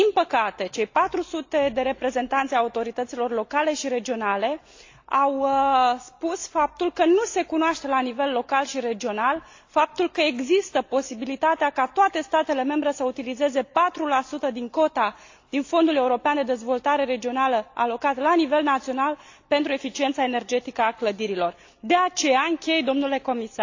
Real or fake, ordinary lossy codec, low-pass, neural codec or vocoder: real; AAC, 48 kbps; 7.2 kHz; none